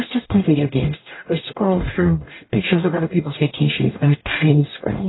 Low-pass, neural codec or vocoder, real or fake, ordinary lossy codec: 7.2 kHz; codec, 44.1 kHz, 0.9 kbps, DAC; fake; AAC, 16 kbps